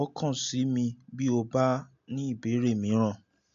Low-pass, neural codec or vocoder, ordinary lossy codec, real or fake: 7.2 kHz; none; AAC, 48 kbps; real